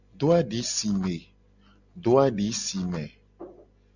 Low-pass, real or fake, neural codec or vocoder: 7.2 kHz; real; none